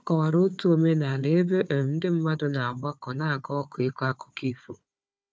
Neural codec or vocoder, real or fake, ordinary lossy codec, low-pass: codec, 16 kHz, 4 kbps, FunCodec, trained on Chinese and English, 50 frames a second; fake; none; none